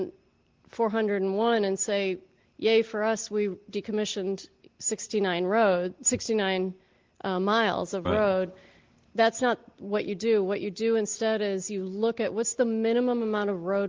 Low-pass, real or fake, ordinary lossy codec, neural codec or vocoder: 7.2 kHz; real; Opus, 16 kbps; none